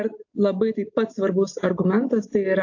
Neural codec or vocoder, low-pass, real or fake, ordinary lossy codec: none; 7.2 kHz; real; AAC, 48 kbps